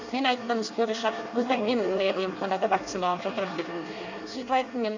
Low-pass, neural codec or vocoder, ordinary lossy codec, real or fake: 7.2 kHz; codec, 24 kHz, 1 kbps, SNAC; AAC, 48 kbps; fake